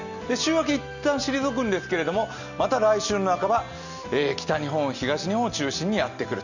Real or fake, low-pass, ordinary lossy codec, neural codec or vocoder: real; 7.2 kHz; none; none